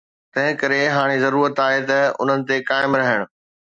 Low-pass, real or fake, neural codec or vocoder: 9.9 kHz; real; none